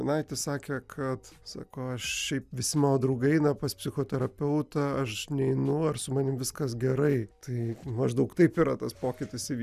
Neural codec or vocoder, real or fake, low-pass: none; real; 14.4 kHz